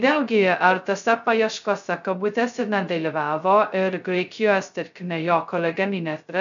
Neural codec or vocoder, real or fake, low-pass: codec, 16 kHz, 0.2 kbps, FocalCodec; fake; 7.2 kHz